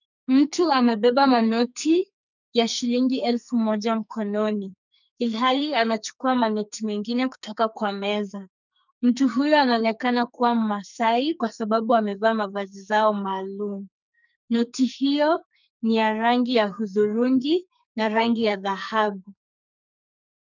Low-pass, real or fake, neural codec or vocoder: 7.2 kHz; fake; codec, 44.1 kHz, 2.6 kbps, SNAC